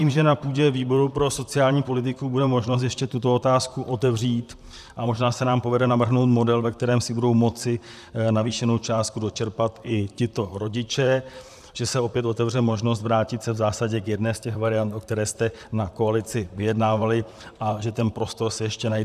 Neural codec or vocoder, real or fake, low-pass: vocoder, 44.1 kHz, 128 mel bands, Pupu-Vocoder; fake; 14.4 kHz